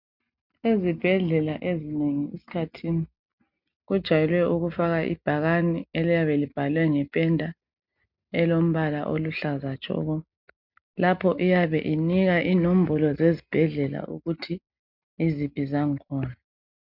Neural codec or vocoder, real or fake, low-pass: none; real; 5.4 kHz